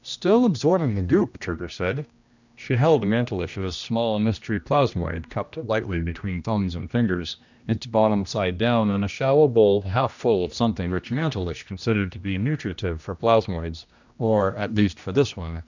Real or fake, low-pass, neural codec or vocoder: fake; 7.2 kHz; codec, 16 kHz, 1 kbps, X-Codec, HuBERT features, trained on general audio